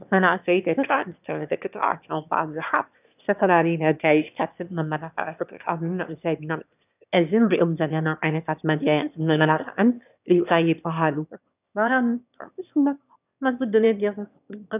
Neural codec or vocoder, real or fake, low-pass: autoencoder, 22.05 kHz, a latent of 192 numbers a frame, VITS, trained on one speaker; fake; 3.6 kHz